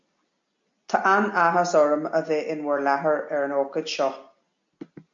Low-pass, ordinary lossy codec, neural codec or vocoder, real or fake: 7.2 kHz; MP3, 48 kbps; none; real